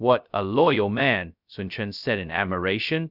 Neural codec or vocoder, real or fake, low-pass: codec, 16 kHz, 0.2 kbps, FocalCodec; fake; 5.4 kHz